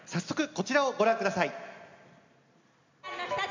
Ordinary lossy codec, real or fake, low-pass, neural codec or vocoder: MP3, 64 kbps; real; 7.2 kHz; none